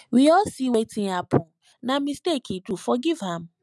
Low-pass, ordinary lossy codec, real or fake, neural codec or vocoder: none; none; real; none